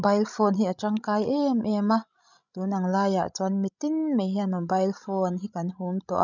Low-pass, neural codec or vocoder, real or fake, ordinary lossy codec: 7.2 kHz; codec, 16 kHz, 16 kbps, FreqCodec, larger model; fake; none